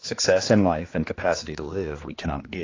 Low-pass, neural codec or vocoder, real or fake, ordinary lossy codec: 7.2 kHz; codec, 16 kHz, 2 kbps, X-Codec, HuBERT features, trained on general audio; fake; AAC, 32 kbps